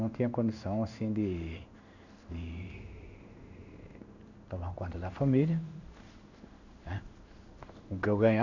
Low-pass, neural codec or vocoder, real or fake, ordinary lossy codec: 7.2 kHz; codec, 16 kHz in and 24 kHz out, 1 kbps, XY-Tokenizer; fake; none